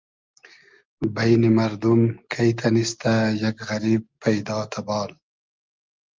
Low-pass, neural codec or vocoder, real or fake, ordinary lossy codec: 7.2 kHz; none; real; Opus, 24 kbps